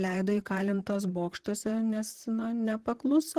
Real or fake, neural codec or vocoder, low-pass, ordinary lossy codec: fake; vocoder, 44.1 kHz, 128 mel bands, Pupu-Vocoder; 14.4 kHz; Opus, 16 kbps